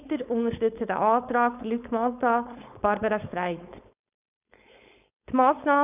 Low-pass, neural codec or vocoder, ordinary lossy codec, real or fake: 3.6 kHz; codec, 16 kHz, 4.8 kbps, FACodec; none; fake